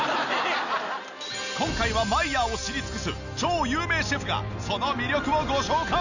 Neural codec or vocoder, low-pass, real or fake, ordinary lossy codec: none; 7.2 kHz; real; none